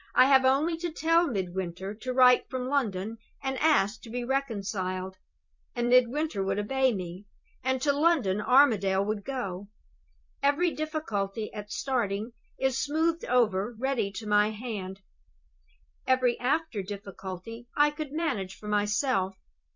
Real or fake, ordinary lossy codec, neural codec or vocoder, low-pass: fake; MP3, 48 kbps; vocoder, 44.1 kHz, 128 mel bands every 256 samples, BigVGAN v2; 7.2 kHz